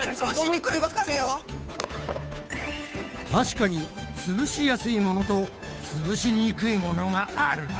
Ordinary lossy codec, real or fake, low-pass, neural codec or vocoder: none; fake; none; codec, 16 kHz, 2 kbps, FunCodec, trained on Chinese and English, 25 frames a second